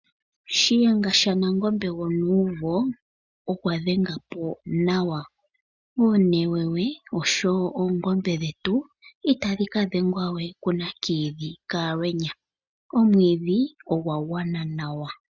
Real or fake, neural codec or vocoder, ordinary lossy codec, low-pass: real; none; Opus, 64 kbps; 7.2 kHz